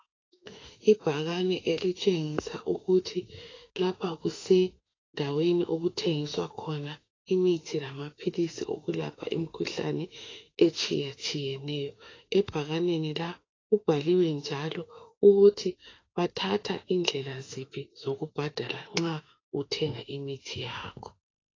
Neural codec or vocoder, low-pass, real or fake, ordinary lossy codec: autoencoder, 48 kHz, 32 numbers a frame, DAC-VAE, trained on Japanese speech; 7.2 kHz; fake; AAC, 32 kbps